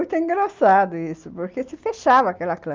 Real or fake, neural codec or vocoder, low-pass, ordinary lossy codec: real; none; 7.2 kHz; Opus, 24 kbps